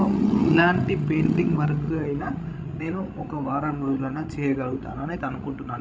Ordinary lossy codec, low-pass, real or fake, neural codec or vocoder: none; none; fake; codec, 16 kHz, 16 kbps, FreqCodec, larger model